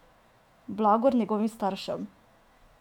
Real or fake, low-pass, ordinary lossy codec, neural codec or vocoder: fake; 19.8 kHz; none; autoencoder, 48 kHz, 128 numbers a frame, DAC-VAE, trained on Japanese speech